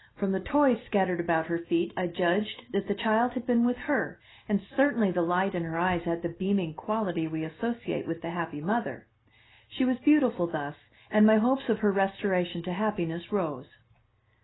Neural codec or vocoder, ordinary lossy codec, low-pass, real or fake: none; AAC, 16 kbps; 7.2 kHz; real